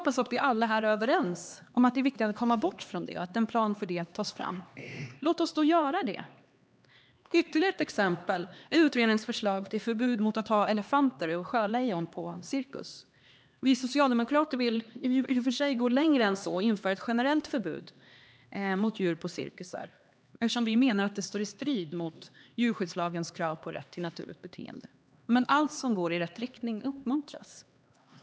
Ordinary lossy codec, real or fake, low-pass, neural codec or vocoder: none; fake; none; codec, 16 kHz, 2 kbps, X-Codec, HuBERT features, trained on LibriSpeech